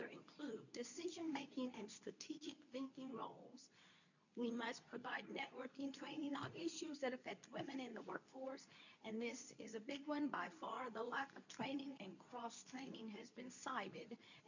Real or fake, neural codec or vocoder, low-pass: fake; codec, 24 kHz, 0.9 kbps, WavTokenizer, medium speech release version 2; 7.2 kHz